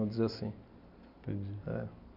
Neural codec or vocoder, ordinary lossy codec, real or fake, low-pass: none; none; real; 5.4 kHz